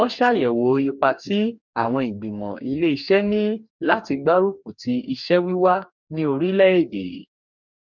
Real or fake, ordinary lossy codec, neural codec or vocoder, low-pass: fake; none; codec, 44.1 kHz, 2.6 kbps, DAC; 7.2 kHz